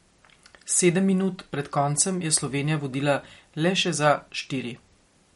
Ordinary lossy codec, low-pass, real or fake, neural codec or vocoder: MP3, 48 kbps; 19.8 kHz; real; none